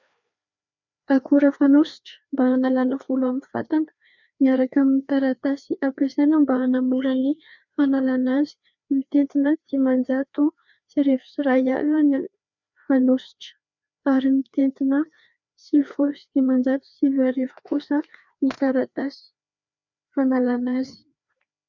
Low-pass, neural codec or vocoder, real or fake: 7.2 kHz; codec, 16 kHz, 2 kbps, FreqCodec, larger model; fake